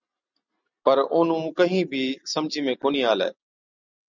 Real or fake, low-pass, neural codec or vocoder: real; 7.2 kHz; none